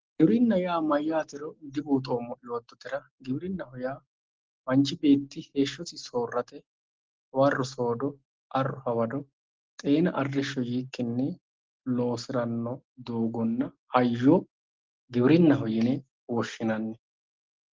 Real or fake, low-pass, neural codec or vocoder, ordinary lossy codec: real; 7.2 kHz; none; Opus, 16 kbps